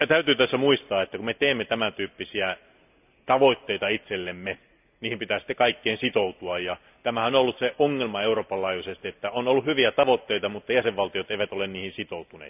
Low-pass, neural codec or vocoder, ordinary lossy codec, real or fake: 3.6 kHz; none; none; real